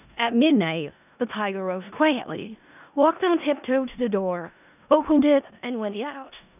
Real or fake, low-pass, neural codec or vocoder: fake; 3.6 kHz; codec, 16 kHz in and 24 kHz out, 0.4 kbps, LongCat-Audio-Codec, four codebook decoder